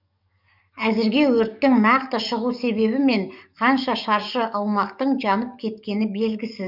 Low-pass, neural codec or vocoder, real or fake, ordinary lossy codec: 5.4 kHz; codec, 44.1 kHz, 7.8 kbps, DAC; fake; none